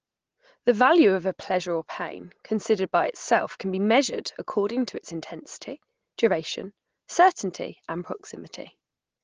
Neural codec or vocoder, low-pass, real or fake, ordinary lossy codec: none; 7.2 kHz; real; Opus, 16 kbps